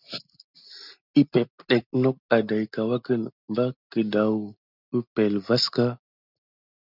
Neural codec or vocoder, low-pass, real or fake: none; 5.4 kHz; real